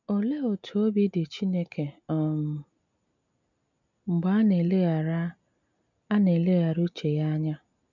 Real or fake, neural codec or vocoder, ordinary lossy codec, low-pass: real; none; none; 7.2 kHz